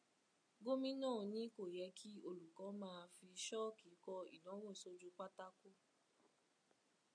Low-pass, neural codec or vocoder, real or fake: 9.9 kHz; none; real